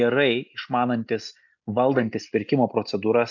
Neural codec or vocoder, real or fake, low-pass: none; real; 7.2 kHz